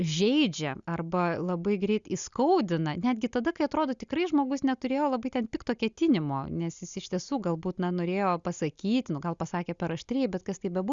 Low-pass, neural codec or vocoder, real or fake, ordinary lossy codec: 7.2 kHz; none; real; Opus, 64 kbps